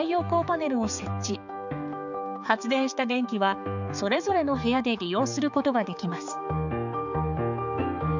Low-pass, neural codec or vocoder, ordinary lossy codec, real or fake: 7.2 kHz; codec, 16 kHz, 4 kbps, X-Codec, HuBERT features, trained on balanced general audio; none; fake